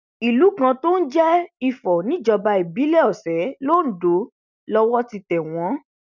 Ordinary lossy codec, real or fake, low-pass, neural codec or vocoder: none; real; 7.2 kHz; none